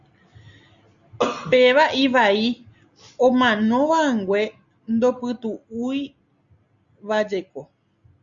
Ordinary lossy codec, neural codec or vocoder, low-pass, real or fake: Opus, 64 kbps; none; 7.2 kHz; real